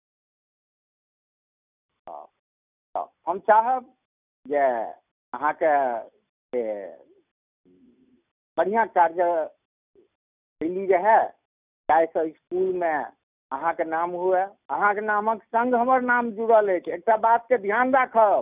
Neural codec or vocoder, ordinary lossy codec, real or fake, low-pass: none; none; real; 3.6 kHz